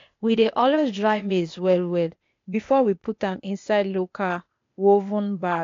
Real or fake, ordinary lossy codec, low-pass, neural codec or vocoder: fake; AAC, 48 kbps; 7.2 kHz; codec, 16 kHz, 0.8 kbps, ZipCodec